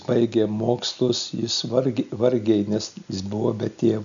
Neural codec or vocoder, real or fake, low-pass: none; real; 7.2 kHz